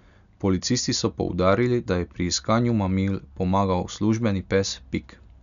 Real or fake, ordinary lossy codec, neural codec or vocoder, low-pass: real; none; none; 7.2 kHz